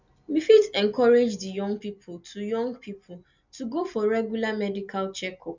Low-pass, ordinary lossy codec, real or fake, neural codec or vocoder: 7.2 kHz; none; real; none